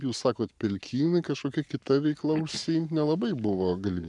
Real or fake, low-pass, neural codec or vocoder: fake; 10.8 kHz; codec, 44.1 kHz, 7.8 kbps, Pupu-Codec